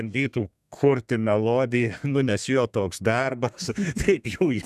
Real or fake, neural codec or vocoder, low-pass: fake; codec, 32 kHz, 1.9 kbps, SNAC; 14.4 kHz